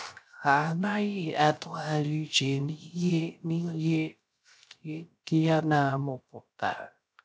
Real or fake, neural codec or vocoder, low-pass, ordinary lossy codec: fake; codec, 16 kHz, 0.3 kbps, FocalCodec; none; none